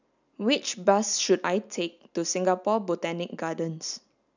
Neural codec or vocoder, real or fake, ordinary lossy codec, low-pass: none; real; none; 7.2 kHz